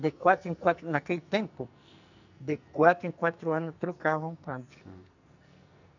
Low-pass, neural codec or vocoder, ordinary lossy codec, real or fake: 7.2 kHz; codec, 44.1 kHz, 2.6 kbps, SNAC; none; fake